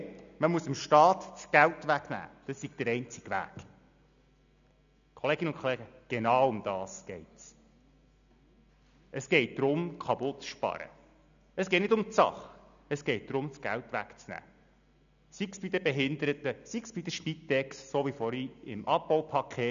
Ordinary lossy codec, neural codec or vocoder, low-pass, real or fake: none; none; 7.2 kHz; real